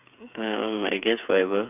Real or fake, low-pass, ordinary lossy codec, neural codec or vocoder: fake; 3.6 kHz; none; codec, 16 kHz, 8 kbps, FreqCodec, smaller model